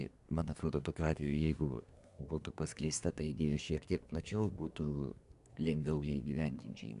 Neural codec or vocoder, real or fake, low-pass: codec, 24 kHz, 1 kbps, SNAC; fake; 10.8 kHz